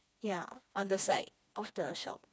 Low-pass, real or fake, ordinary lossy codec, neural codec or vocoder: none; fake; none; codec, 16 kHz, 2 kbps, FreqCodec, smaller model